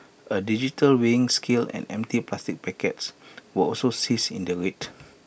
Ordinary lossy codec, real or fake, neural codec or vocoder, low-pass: none; real; none; none